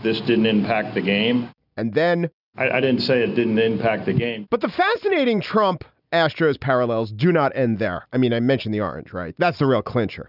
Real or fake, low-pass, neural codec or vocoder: real; 5.4 kHz; none